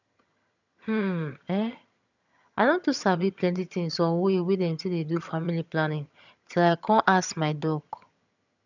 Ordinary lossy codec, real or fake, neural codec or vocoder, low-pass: none; fake; vocoder, 22.05 kHz, 80 mel bands, HiFi-GAN; 7.2 kHz